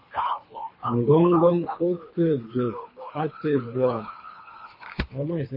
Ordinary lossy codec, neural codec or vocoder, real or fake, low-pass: MP3, 24 kbps; codec, 24 kHz, 3 kbps, HILCodec; fake; 5.4 kHz